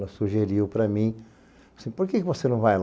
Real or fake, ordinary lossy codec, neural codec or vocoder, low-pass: real; none; none; none